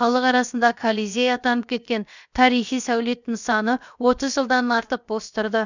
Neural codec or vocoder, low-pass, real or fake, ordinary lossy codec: codec, 16 kHz, about 1 kbps, DyCAST, with the encoder's durations; 7.2 kHz; fake; none